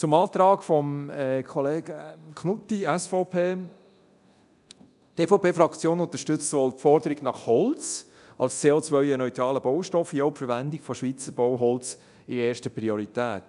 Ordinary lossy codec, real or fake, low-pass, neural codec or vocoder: none; fake; 10.8 kHz; codec, 24 kHz, 0.9 kbps, DualCodec